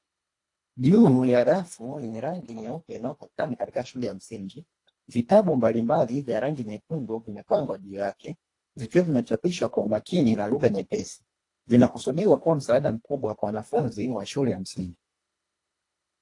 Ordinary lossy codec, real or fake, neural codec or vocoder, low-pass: AAC, 48 kbps; fake; codec, 24 kHz, 1.5 kbps, HILCodec; 10.8 kHz